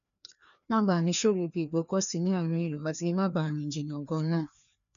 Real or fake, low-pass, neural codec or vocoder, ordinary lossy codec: fake; 7.2 kHz; codec, 16 kHz, 2 kbps, FreqCodec, larger model; none